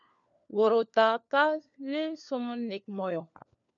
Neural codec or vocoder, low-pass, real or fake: codec, 16 kHz, 4 kbps, FunCodec, trained on LibriTTS, 50 frames a second; 7.2 kHz; fake